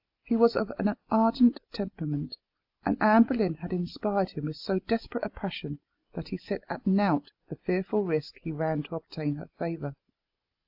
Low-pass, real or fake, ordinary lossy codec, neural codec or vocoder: 5.4 kHz; real; AAC, 48 kbps; none